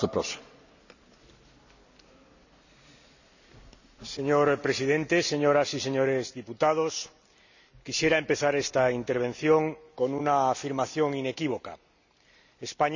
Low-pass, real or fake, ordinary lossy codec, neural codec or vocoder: 7.2 kHz; real; none; none